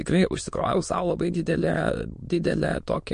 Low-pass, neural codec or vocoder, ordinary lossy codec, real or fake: 9.9 kHz; autoencoder, 22.05 kHz, a latent of 192 numbers a frame, VITS, trained on many speakers; MP3, 48 kbps; fake